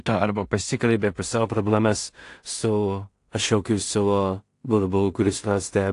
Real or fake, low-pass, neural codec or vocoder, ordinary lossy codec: fake; 10.8 kHz; codec, 16 kHz in and 24 kHz out, 0.4 kbps, LongCat-Audio-Codec, two codebook decoder; AAC, 48 kbps